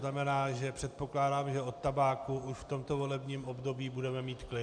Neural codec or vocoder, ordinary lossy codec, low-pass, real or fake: none; MP3, 96 kbps; 9.9 kHz; real